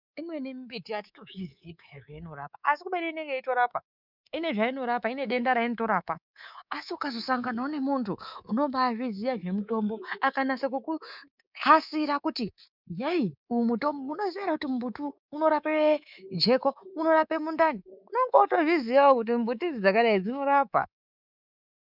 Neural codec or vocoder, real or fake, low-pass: codec, 24 kHz, 3.1 kbps, DualCodec; fake; 5.4 kHz